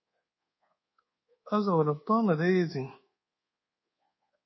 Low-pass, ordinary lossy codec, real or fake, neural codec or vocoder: 7.2 kHz; MP3, 24 kbps; fake; codec, 24 kHz, 1.2 kbps, DualCodec